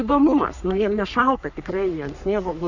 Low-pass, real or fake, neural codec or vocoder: 7.2 kHz; fake; codec, 44.1 kHz, 3.4 kbps, Pupu-Codec